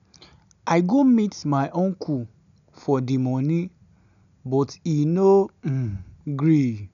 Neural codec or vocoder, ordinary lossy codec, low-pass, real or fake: none; none; 7.2 kHz; real